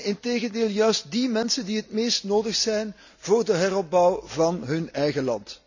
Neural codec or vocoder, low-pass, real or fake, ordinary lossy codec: none; 7.2 kHz; real; none